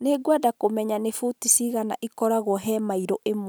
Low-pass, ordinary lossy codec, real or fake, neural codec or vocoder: none; none; real; none